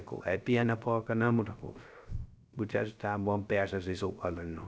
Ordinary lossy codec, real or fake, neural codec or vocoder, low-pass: none; fake; codec, 16 kHz, 0.3 kbps, FocalCodec; none